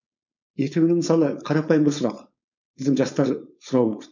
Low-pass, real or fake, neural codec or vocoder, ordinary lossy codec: 7.2 kHz; fake; codec, 16 kHz, 4.8 kbps, FACodec; none